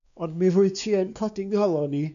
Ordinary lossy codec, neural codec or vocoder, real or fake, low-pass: AAC, 96 kbps; codec, 16 kHz, 2 kbps, X-Codec, WavLM features, trained on Multilingual LibriSpeech; fake; 7.2 kHz